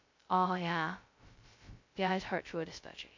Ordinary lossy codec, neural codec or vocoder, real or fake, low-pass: MP3, 48 kbps; codec, 16 kHz, 0.2 kbps, FocalCodec; fake; 7.2 kHz